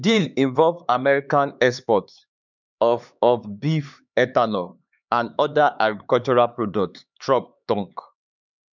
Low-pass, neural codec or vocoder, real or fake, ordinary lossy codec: 7.2 kHz; codec, 16 kHz, 4 kbps, X-Codec, HuBERT features, trained on LibriSpeech; fake; none